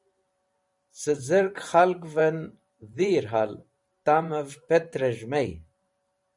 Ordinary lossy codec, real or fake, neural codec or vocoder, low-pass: MP3, 96 kbps; fake; vocoder, 44.1 kHz, 128 mel bands every 512 samples, BigVGAN v2; 10.8 kHz